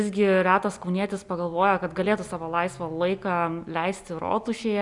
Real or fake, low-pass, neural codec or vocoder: real; 10.8 kHz; none